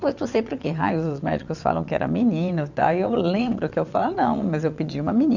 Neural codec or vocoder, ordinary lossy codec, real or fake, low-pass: vocoder, 22.05 kHz, 80 mel bands, WaveNeXt; none; fake; 7.2 kHz